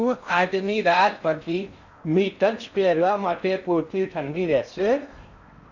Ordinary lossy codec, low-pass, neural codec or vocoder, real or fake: none; 7.2 kHz; codec, 16 kHz in and 24 kHz out, 0.6 kbps, FocalCodec, streaming, 4096 codes; fake